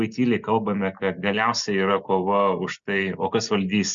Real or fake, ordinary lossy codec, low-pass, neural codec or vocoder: real; Opus, 64 kbps; 7.2 kHz; none